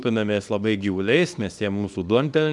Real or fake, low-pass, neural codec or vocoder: fake; 10.8 kHz; codec, 24 kHz, 0.9 kbps, WavTokenizer, small release